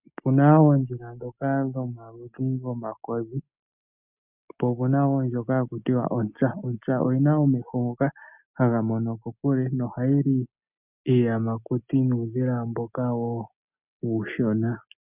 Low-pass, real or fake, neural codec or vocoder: 3.6 kHz; real; none